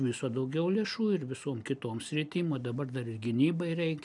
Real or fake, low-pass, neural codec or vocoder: real; 10.8 kHz; none